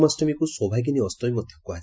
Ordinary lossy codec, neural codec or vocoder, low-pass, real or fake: none; none; none; real